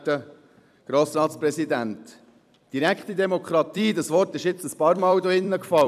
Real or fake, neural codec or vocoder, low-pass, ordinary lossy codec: fake; vocoder, 44.1 kHz, 128 mel bands every 256 samples, BigVGAN v2; 14.4 kHz; none